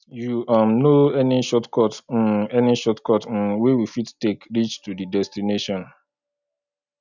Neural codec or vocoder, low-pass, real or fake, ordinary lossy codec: none; 7.2 kHz; real; none